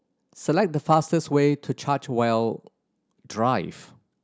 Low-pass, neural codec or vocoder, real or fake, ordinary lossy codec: none; none; real; none